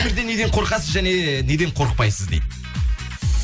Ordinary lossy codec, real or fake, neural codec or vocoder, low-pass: none; real; none; none